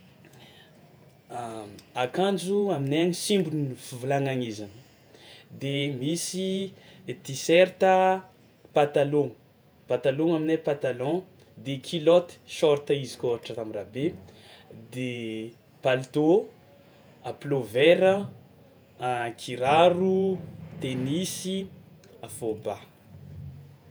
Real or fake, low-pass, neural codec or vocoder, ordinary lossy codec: fake; none; vocoder, 48 kHz, 128 mel bands, Vocos; none